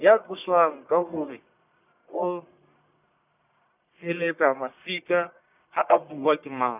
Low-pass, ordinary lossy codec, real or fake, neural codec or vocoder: 3.6 kHz; none; fake; codec, 44.1 kHz, 1.7 kbps, Pupu-Codec